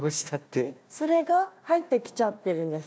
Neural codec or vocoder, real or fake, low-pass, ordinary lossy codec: codec, 16 kHz, 1 kbps, FunCodec, trained on Chinese and English, 50 frames a second; fake; none; none